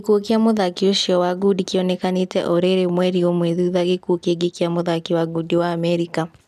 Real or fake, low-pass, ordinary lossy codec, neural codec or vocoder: real; 14.4 kHz; none; none